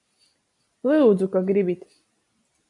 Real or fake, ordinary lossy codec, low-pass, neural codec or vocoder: real; AAC, 64 kbps; 10.8 kHz; none